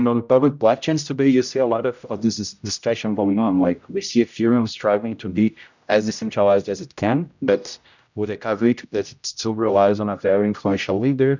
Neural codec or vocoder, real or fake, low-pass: codec, 16 kHz, 0.5 kbps, X-Codec, HuBERT features, trained on general audio; fake; 7.2 kHz